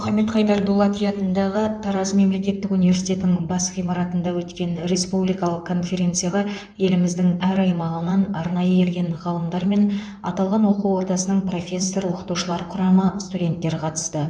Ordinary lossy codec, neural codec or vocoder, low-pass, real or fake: none; codec, 16 kHz in and 24 kHz out, 2.2 kbps, FireRedTTS-2 codec; 9.9 kHz; fake